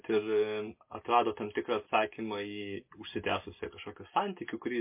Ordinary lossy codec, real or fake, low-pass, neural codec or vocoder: MP3, 24 kbps; real; 3.6 kHz; none